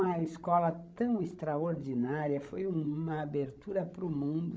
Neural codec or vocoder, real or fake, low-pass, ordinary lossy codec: codec, 16 kHz, 16 kbps, FreqCodec, larger model; fake; none; none